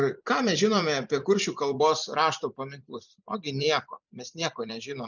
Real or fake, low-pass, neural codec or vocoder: fake; 7.2 kHz; vocoder, 44.1 kHz, 128 mel bands every 256 samples, BigVGAN v2